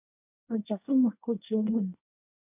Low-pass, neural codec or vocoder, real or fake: 3.6 kHz; codec, 24 kHz, 1 kbps, SNAC; fake